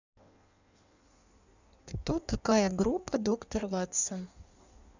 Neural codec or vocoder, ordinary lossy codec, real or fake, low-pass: codec, 16 kHz in and 24 kHz out, 1.1 kbps, FireRedTTS-2 codec; none; fake; 7.2 kHz